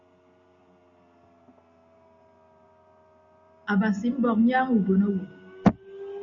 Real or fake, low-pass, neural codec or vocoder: real; 7.2 kHz; none